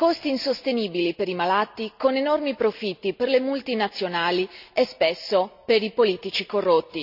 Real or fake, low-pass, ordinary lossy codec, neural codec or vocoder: real; 5.4 kHz; none; none